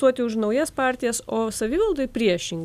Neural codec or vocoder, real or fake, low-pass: none; real; 14.4 kHz